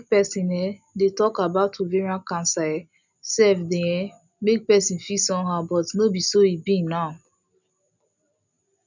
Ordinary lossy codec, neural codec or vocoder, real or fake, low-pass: none; none; real; 7.2 kHz